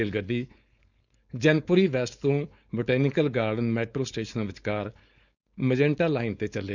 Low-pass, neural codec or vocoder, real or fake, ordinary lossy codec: 7.2 kHz; codec, 16 kHz, 4.8 kbps, FACodec; fake; none